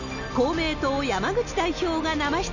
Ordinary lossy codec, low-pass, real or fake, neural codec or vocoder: none; 7.2 kHz; real; none